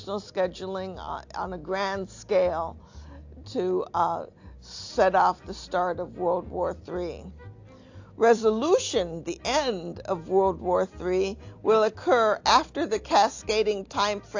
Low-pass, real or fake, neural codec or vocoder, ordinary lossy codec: 7.2 kHz; real; none; AAC, 48 kbps